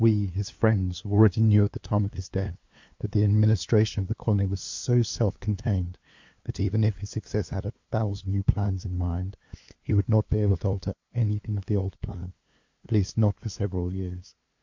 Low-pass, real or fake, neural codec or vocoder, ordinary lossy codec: 7.2 kHz; fake; codec, 16 kHz, 2 kbps, FunCodec, trained on LibriTTS, 25 frames a second; MP3, 48 kbps